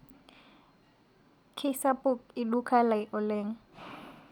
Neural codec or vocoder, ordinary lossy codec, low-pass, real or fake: none; none; 19.8 kHz; real